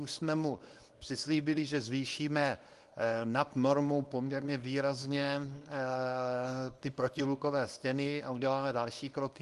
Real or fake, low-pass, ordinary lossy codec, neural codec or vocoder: fake; 10.8 kHz; Opus, 32 kbps; codec, 24 kHz, 0.9 kbps, WavTokenizer, small release